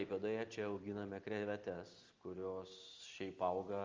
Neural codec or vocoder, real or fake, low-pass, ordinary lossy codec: none; real; 7.2 kHz; Opus, 32 kbps